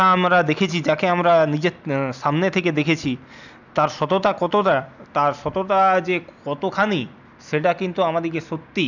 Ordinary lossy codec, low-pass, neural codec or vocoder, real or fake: none; 7.2 kHz; none; real